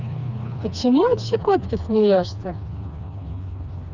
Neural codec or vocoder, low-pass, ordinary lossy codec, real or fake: codec, 16 kHz, 2 kbps, FreqCodec, smaller model; 7.2 kHz; none; fake